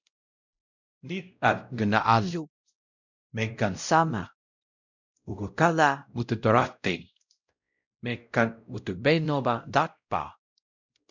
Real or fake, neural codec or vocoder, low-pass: fake; codec, 16 kHz, 0.5 kbps, X-Codec, WavLM features, trained on Multilingual LibriSpeech; 7.2 kHz